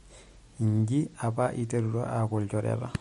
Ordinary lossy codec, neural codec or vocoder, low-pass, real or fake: MP3, 48 kbps; none; 19.8 kHz; real